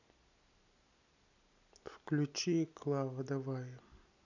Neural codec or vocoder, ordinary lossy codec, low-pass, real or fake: none; none; 7.2 kHz; real